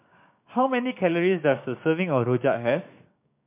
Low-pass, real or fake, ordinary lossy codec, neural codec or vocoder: 3.6 kHz; fake; MP3, 32 kbps; codec, 16 kHz, 6 kbps, DAC